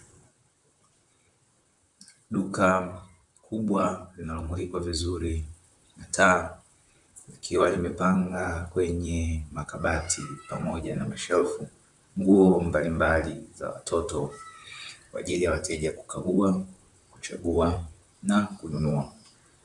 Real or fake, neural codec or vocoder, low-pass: fake; vocoder, 44.1 kHz, 128 mel bands, Pupu-Vocoder; 10.8 kHz